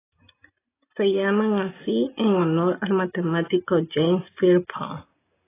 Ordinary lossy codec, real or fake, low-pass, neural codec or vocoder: AAC, 16 kbps; real; 3.6 kHz; none